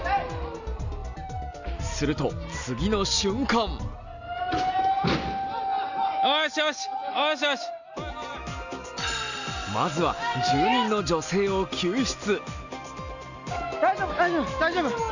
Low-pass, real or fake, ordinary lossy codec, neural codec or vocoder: 7.2 kHz; real; none; none